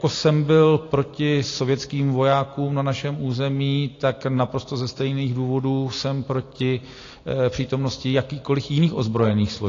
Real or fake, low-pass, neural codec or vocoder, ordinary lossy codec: real; 7.2 kHz; none; AAC, 32 kbps